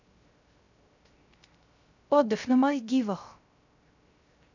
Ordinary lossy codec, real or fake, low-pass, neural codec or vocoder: none; fake; 7.2 kHz; codec, 16 kHz, 0.3 kbps, FocalCodec